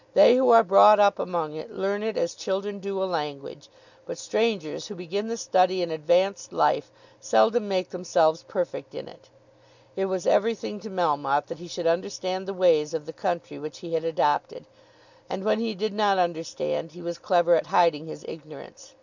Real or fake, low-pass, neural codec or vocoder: real; 7.2 kHz; none